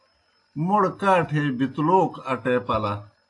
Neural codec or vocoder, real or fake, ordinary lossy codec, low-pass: none; real; AAC, 48 kbps; 10.8 kHz